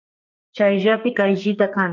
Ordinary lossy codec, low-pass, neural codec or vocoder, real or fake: MP3, 48 kbps; 7.2 kHz; codec, 44.1 kHz, 2.6 kbps, SNAC; fake